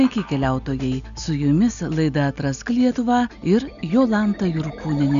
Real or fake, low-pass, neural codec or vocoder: real; 7.2 kHz; none